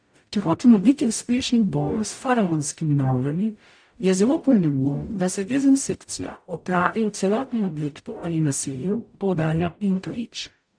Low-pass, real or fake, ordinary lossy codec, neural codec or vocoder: 9.9 kHz; fake; AAC, 64 kbps; codec, 44.1 kHz, 0.9 kbps, DAC